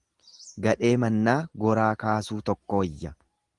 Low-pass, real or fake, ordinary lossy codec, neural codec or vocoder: 10.8 kHz; real; Opus, 24 kbps; none